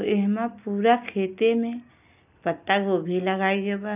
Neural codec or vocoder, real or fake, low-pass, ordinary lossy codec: none; real; 3.6 kHz; none